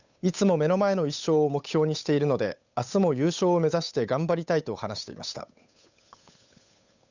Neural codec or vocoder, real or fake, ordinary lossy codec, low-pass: codec, 16 kHz, 8 kbps, FunCodec, trained on Chinese and English, 25 frames a second; fake; none; 7.2 kHz